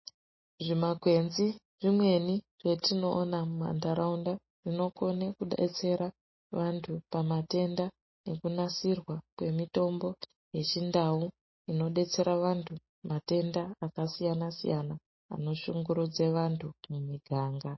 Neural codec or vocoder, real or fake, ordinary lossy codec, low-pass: none; real; MP3, 24 kbps; 7.2 kHz